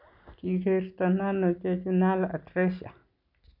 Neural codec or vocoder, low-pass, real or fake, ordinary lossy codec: none; 5.4 kHz; real; none